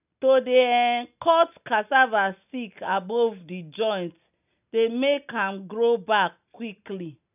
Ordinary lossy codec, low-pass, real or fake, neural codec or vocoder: none; 3.6 kHz; real; none